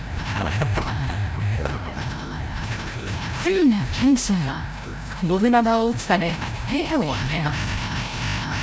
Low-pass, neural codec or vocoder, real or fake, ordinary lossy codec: none; codec, 16 kHz, 0.5 kbps, FreqCodec, larger model; fake; none